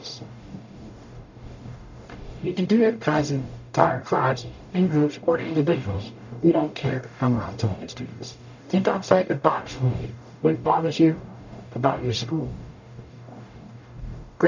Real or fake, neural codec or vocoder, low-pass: fake; codec, 44.1 kHz, 0.9 kbps, DAC; 7.2 kHz